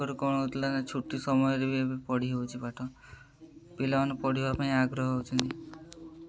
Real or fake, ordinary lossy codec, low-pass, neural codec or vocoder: real; none; none; none